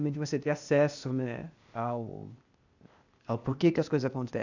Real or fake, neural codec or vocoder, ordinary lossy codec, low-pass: fake; codec, 16 kHz, 0.8 kbps, ZipCodec; none; 7.2 kHz